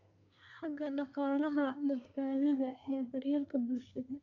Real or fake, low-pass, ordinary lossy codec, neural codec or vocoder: fake; 7.2 kHz; none; codec, 24 kHz, 1 kbps, SNAC